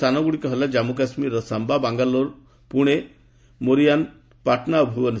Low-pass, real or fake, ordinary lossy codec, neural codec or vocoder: none; real; none; none